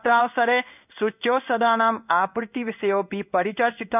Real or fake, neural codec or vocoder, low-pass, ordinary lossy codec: fake; codec, 16 kHz in and 24 kHz out, 1 kbps, XY-Tokenizer; 3.6 kHz; none